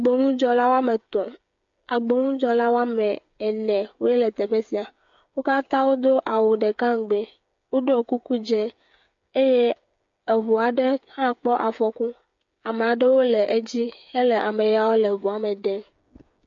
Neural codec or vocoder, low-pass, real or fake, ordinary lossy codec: codec, 16 kHz, 8 kbps, FreqCodec, smaller model; 7.2 kHz; fake; MP3, 48 kbps